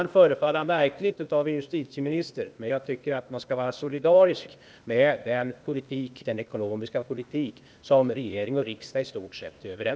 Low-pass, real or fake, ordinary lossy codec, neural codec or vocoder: none; fake; none; codec, 16 kHz, 0.8 kbps, ZipCodec